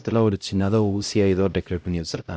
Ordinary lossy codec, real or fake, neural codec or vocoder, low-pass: none; fake; codec, 16 kHz, 0.5 kbps, X-Codec, HuBERT features, trained on LibriSpeech; none